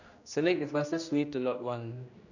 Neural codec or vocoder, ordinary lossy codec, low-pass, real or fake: codec, 16 kHz, 1 kbps, X-Codec, HuBERT features, trained on general audio; none; 7.2 kHz; fake